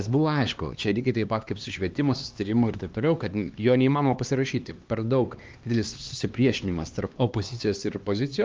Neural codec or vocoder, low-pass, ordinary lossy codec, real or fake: codec, 16 kHz, 2 kbps, X-Codec, HuBERT features, trained on LibriSpeech; 7.2 kHz; Opus, 24 kbps; fake